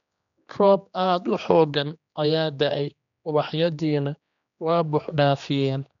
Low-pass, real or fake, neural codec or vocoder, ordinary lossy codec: 7.2 kHz; fake; codec, 16 kHz, 2 kbps, X-Codec, HuBERT features, trained on general audio; none